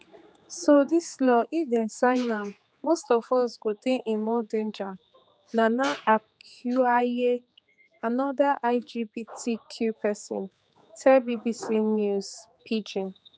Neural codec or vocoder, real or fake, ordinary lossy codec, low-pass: codec, 16 kHz, 4 kbps, X-Codec, HuBERT features, trained on general audio; fake; none; none